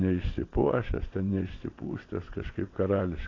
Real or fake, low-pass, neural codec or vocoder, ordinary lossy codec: fake; 7.2 kHz; vocoder, 22.05 kHz, 80 mel bands, WaveNeXt; AAC, 32 kbps